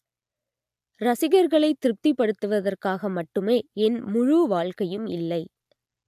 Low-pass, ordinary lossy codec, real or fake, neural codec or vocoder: 14.4 kHz; AAC, 96 kbps; real; none